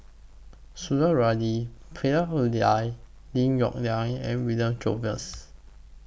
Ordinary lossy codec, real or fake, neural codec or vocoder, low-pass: none; real; none; none